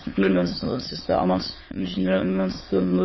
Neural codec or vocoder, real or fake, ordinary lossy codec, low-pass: autoencoder, 22.05 kHz, a latent of 192 numbers a frame, VITS, trained on many speakers; fake; MP3, 24 kbps; 7.2 kHz